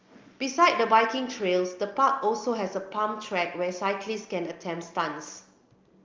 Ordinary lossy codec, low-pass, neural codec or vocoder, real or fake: Opus, 24 kbps; 7.2 kHz; none; real